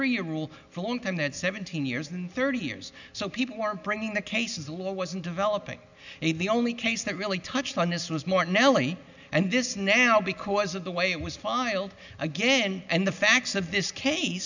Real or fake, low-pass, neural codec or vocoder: real; 7.2 kHz; none